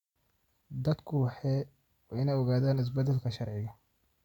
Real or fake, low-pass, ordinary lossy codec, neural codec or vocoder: real; 19.8 kHz; none; none